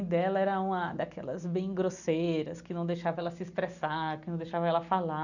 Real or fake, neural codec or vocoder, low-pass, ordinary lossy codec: real; none; 7.2 kHz; none